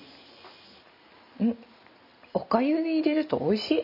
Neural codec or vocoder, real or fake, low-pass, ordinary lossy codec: none; real; 5.4 kHz; none